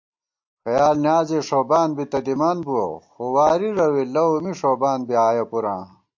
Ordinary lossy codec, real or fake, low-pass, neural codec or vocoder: MP3, 48 kbps; real; 7.2 kHz; none